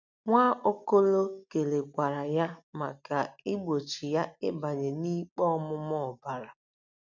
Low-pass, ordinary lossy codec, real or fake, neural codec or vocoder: 7.2 kHz; none; real; none